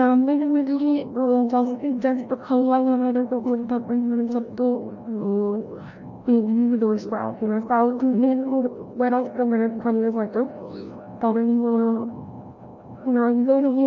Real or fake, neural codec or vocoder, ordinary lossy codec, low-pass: fake; codec, 16 kHz, 0.5 kbps, FreqCodec, larger model; none; 7.2 kHz